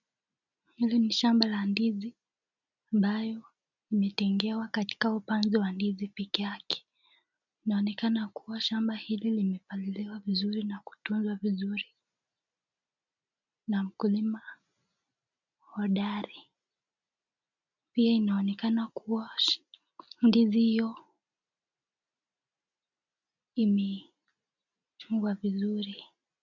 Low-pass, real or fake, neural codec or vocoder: 7.2 kHz; real; none